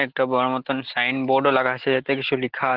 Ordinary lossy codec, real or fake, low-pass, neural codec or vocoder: Opus, 16 kbps; real; 5.4 kHz; none